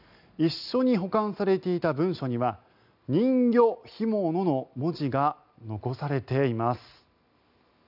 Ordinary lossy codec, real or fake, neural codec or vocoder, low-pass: none; real; none; 5.4 kHz